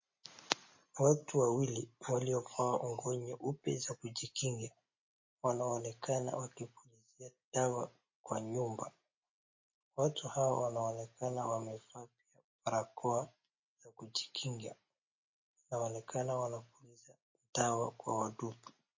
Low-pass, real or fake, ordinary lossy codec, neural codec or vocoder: 7.2 kHz; real; MP3, 32 kbps; none